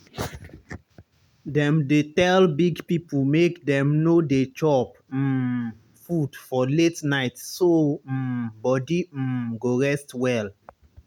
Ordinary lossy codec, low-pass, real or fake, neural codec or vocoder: none; 19.8 kHz; real; none